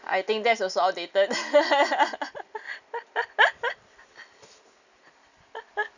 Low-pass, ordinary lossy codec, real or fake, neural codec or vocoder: 7.2 kHz; none; real; none